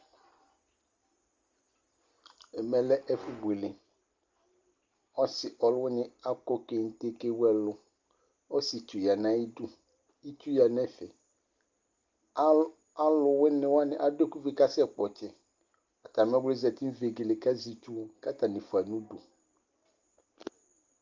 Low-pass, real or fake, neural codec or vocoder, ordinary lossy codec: 7.2 kHz; real; none; Opus, 32 kbps